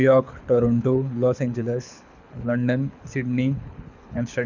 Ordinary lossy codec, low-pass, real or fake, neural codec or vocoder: none; 7.2 kHz; fake; codec, 24 kHz, 6 kbps, HILCodec